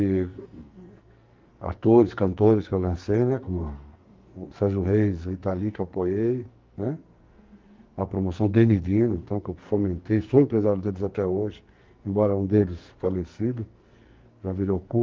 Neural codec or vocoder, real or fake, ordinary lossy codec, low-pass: codec, 44.1 kHz, 2.6 kbps, SNAC; fake; Opus, 32 kbps; 7.2 kHz